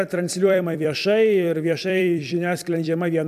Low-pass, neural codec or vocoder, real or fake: 14.4 kHz; vocoder, 44.1 kHz, 128 mel bands every 256 samples, BigVGAN v2; fake